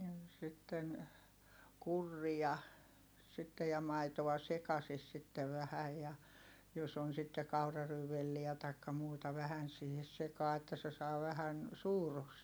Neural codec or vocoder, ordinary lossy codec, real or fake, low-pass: none; none; real; none